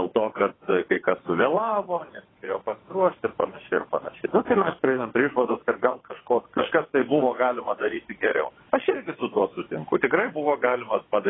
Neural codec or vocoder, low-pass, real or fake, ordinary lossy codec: vocoder, 22.05 kHz, 80 mel bands, WaveNeXt; 7.2 kHz; fake; AAC, 16 kbps